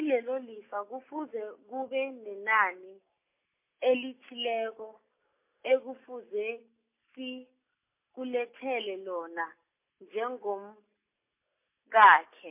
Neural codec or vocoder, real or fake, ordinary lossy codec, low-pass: none; real; MP3, 24 kbps; 3.6 kHz